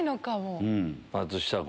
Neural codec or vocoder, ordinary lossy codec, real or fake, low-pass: none; none; real; none